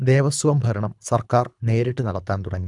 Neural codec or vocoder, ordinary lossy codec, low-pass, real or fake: codec, 24 kHz, 3 kbps, HILCodec; none; 10.8 kHz; fake